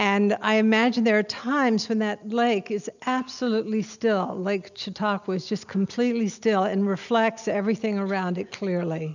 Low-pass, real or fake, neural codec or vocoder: 7.2 kHz; real; none